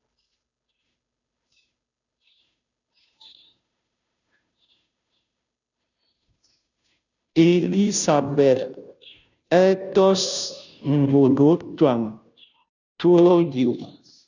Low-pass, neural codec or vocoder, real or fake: 7.2 kHz; codec, 16 kHz, 0.5 kbps, FunCodec, trained on Chinese and English, 25 frames a second; fake